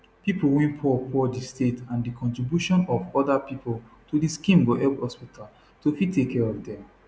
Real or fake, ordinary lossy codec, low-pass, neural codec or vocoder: real; none; none; none